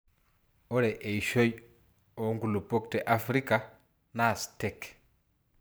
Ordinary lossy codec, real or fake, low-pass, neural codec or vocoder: none; fake; none; vocoder, 44.1 kHz, 128 mel bands every 512 samples, BigVGAN v2